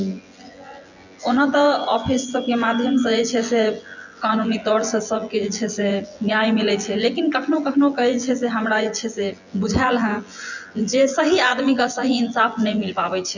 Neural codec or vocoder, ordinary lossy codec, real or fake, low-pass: vocoder, 24 kHz, 100 mel bands, Vocos; none; fake; 7.2 kHz